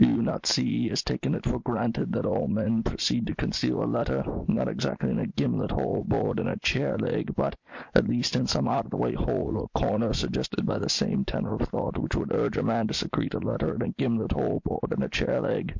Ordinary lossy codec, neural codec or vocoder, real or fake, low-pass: MP3, 48 kbps; none; real; 7.2 kHz